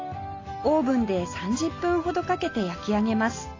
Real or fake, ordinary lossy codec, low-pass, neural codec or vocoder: real; AAC, 32 kbps; 7.2 kHz; none